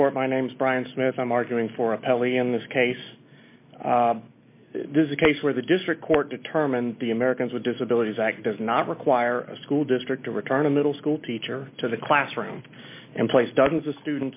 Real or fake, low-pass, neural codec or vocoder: real; 3.6 kHz; none